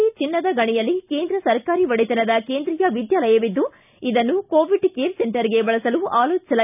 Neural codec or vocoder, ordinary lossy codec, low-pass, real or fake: none; none; 3.6 kHz; real